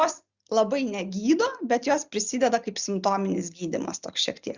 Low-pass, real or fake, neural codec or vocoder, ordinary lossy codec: 7.2 kHz; real; none; Opus, 64 kbps